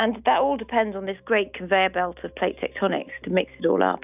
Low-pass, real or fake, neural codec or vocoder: 3.6 kHz; real; none